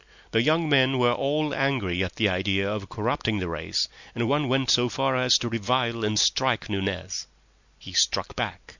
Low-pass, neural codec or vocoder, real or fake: 7.2 kHz; none; real